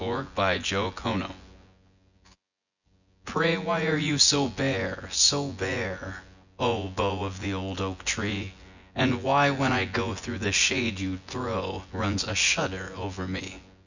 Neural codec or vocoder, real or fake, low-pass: vocoder, 24 kHz, 100 mel bands, Vocos; fake; 7.2 kHz